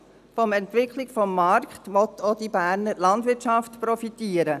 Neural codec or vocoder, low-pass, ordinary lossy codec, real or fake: none; 14.4 kHz; none; real